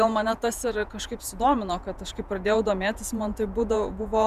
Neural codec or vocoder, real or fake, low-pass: vocoder, 48 kHz, 128 mel bands, Vocos; fake; 14.4 kHz